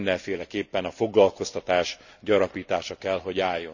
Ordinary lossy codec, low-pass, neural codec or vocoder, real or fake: none; 7.2 kHz; none; real